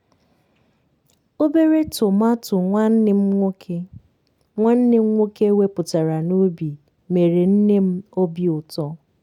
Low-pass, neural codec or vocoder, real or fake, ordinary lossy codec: 19.8 kHz; none; real; none